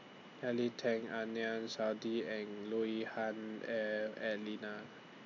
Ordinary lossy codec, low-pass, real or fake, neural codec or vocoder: MP3, 48 kbps; 7.2 kHz; real; none